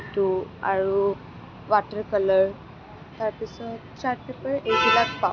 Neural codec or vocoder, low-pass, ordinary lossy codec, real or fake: none; none; none; real